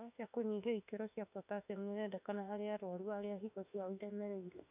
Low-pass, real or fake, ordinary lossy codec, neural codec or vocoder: 3.6 kHz; fake; none; autoencoder, 48 kHz, 32 numbers a frame, DAC-VAE, trained on Japanese speech